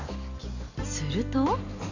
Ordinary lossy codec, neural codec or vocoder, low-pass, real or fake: none; none; 7.2 kHz; real